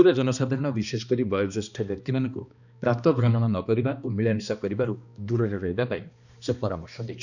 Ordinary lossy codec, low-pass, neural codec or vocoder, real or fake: none; 7.2 kHz; codec, 16 kHz, 2 kbps, X-Codec, HuBERT features, trained on balanced general audio; fake